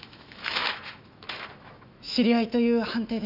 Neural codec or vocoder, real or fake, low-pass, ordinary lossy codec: autoencoder, 48 kHz, 128 numbers a frame, DAC-VAE, trained on Japanese speech; fake; 5.4 kHz; none